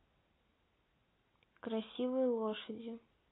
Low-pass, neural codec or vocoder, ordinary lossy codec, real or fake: 7.2 kHz; none; AAC, 16 kbps; real